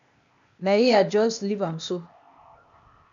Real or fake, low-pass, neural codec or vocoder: fake; 7.2 kHz; codec, 16 kHz, 0.8 kbps, ZipCodec